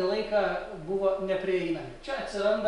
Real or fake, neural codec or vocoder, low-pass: real; none; 10.8 kHz